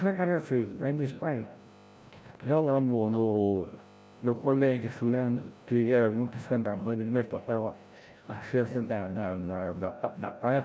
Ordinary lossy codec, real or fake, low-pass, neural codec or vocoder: none; fake; none; codec, 16 kHz, 0.5 kbps, FreqCodec, larger model